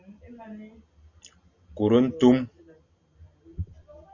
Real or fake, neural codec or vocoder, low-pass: real; none; 7.2 kHz